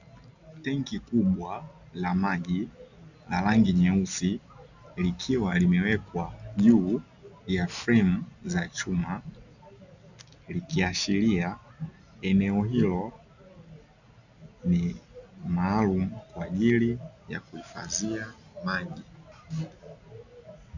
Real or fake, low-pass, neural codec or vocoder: real; 7.2 kHz; none